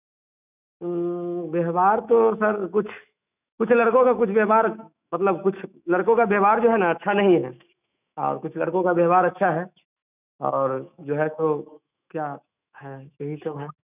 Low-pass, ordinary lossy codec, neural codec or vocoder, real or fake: 3.6 kHz; none; autoencoder, 48 kHz, 128 numbers a frame, DAC-VAE, trained on Japanese speech; fake